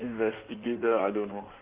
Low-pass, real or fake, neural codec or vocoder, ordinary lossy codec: 3.6 kHz; fake; codec, 16 kHz in and 24 kHz out, 2.2 kbps, FireRedTTS-2 codec; Opus, 24 kbps